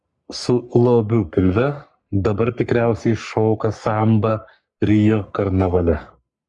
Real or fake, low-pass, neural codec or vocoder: fake; 10.8 kHz; codec, 44.1 kHz, 3.4 kbps, Pupu-Codec